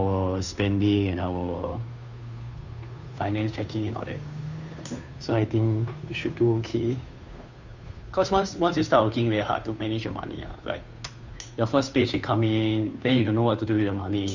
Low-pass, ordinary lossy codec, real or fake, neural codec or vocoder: 7.2 kHz; none; fake; codec, 16 kHz, 2 kbps, FunCodec, trained on Chinese and English, 25 frames a second